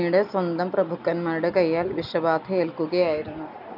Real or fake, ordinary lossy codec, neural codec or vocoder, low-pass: real; none; none; 5.4 kHz